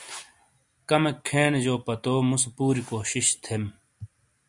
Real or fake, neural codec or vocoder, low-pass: real; none; 10.8 kHz